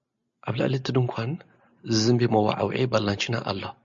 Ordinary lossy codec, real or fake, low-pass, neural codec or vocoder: MP3, 64 kbps; real; 7.2 kHz; none